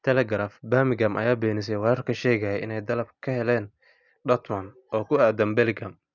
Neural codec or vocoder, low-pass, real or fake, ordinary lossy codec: none; 7.2 kHz; real; none